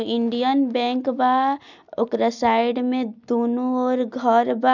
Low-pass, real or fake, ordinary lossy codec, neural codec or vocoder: 7.2 kHz; real; none; none